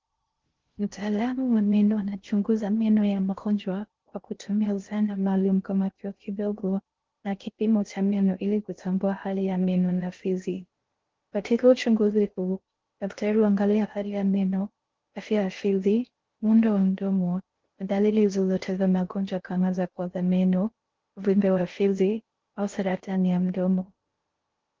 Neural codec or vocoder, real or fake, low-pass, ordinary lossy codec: codec, 16 kHz in and 24 kHz out, 0.6 kbps, FocalCodec, streaming, 2048 codes; fake; 7.2 kHz; Opus, 16 kbps